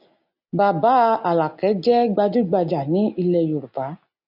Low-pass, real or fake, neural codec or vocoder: 5.4 kHz; real; none